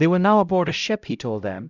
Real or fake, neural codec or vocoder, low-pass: fake; codec, 16 kHz, 0.5 kbps, X-Codec, HuBERT features, trained on LibriSpeech; 7.2 kHz